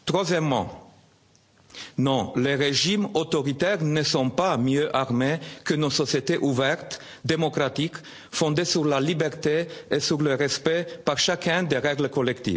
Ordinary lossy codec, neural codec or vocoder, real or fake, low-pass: none; none; real; none